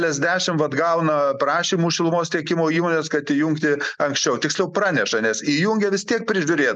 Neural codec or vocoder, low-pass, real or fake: none; 10.8 kHz; real